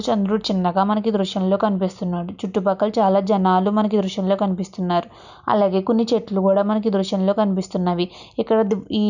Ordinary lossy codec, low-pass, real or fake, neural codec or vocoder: none; 7.2 kHz; real; none